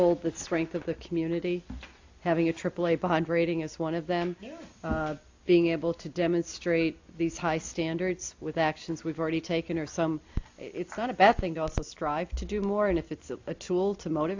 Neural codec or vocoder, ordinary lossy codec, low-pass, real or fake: none; AAC, 48 kbps; 7.2 kHz; real